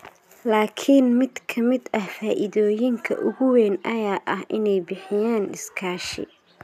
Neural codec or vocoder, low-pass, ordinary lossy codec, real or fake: none; 14.4 kHz; none; real